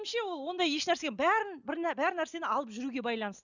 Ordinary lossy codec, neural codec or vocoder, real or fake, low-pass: none; none; real; 7.2 kHz